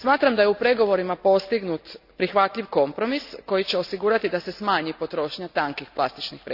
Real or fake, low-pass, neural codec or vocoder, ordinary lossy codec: real; 5.4 kHz; none; none